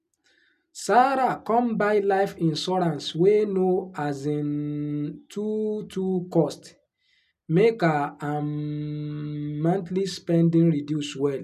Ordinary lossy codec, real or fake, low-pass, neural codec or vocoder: none; real; 14.4 kHz; none